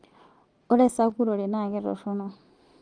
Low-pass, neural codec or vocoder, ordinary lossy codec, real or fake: 9.9 kHz; none; Opus, 24 kbps; real